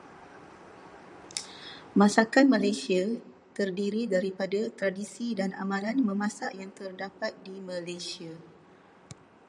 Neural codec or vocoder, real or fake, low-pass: vocoder, 44.1 kHz, 128 mel bands, Pupu-Vocoder; fake; 10.8 kHz